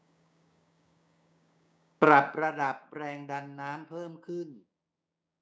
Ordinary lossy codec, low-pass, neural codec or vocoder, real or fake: none; none; codec, 16 kHz, 6 kbps, DAC; fake